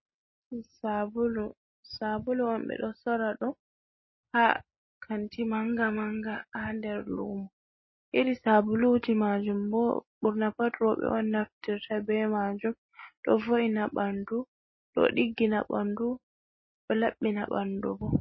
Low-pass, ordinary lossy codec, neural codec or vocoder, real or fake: 7.2 kHz; MP3, 24 kbps; none; real